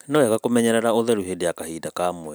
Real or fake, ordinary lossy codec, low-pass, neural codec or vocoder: real; none; none; none